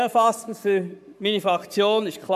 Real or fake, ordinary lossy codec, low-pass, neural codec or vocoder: fake; none; 14.4 kHz; codec, 44.1 kHz, 7.8 kbps, Pupu-Codec